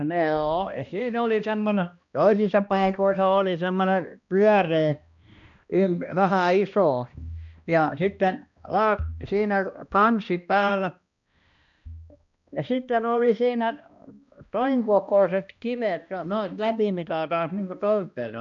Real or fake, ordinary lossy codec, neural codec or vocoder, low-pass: fake; none; codec, 16 kHz, 1 kbps, X-Codec, HuBERT features, trained on balanced general audio; 7.2 kHz